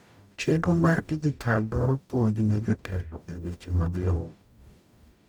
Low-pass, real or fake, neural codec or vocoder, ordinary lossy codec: 19.8 kHz; fake; codec, 44.1 kHz, 0.9 kbps, DAC; none